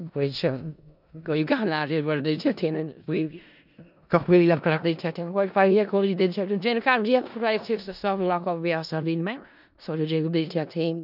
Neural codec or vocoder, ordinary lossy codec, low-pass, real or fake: codec, 16 kHz in and 24 kHz out, 0.4 kbps, LongCat-Audio-Codec, four codebook decoder; none; 5.4 kHz; fake